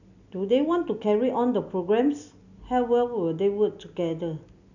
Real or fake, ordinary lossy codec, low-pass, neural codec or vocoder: real; none; 7.2 kHz; none